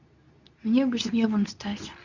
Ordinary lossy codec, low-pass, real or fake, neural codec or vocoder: none; 7.2 kHz; fake; codec, 24 kHz, 0.9 kbps, WavTokenizer, medium speech release version 2